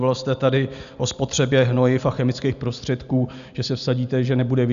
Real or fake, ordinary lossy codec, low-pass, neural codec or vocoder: real; MP3, 96 kbps; 7.2 kHz; none